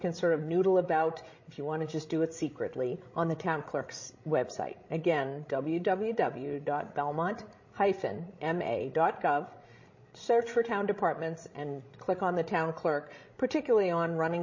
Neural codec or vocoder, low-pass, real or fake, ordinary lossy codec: codec, 16 kHz, 16 kbps, FreqCodec, larger model; 7.2 kHz; fake; MP3, 32 kbps